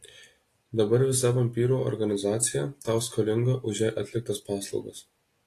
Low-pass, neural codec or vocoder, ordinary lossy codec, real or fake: 14.4 kHz; none; AAC, 48 kbps; real